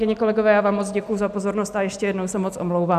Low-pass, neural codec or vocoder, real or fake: 14.4 kHz; none; real